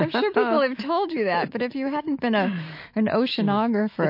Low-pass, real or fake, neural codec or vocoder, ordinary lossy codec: 5.4 kHz; fake; autoencoder, 48 kHz, 128 numbers a frame, DAC-VAE, trained on Japanese speech; MP3, 32 kbps